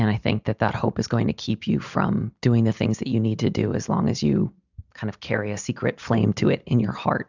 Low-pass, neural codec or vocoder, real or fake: 7.2 kHz; none; real